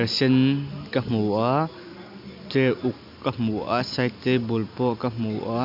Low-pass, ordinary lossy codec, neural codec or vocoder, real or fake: 5.4 kHz; MP3, 48 kbps; none; real